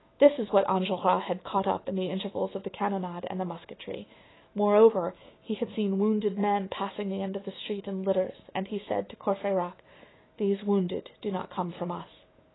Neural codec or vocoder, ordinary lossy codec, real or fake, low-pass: autoencoder, 48 kHz, 128 numbers a frame, DAC-VAE, trained on Japanese speech; AAC, 16 kbps; fake; 7.2 kHz